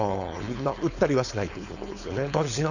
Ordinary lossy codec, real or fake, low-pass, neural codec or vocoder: none; fake; 7.2 kHz; codec, 16 kHz, 4.8 kbps, FACodec